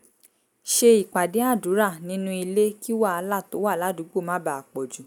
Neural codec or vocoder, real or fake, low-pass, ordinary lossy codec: none; real; none; none